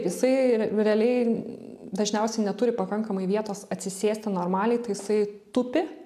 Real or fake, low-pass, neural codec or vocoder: real; 14.4 kHz; none